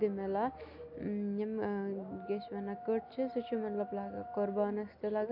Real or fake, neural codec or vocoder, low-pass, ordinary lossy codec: real; none; 5.4 kHz; AAC, 48 kbps